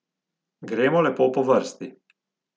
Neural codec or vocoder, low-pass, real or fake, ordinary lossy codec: none; none; real; none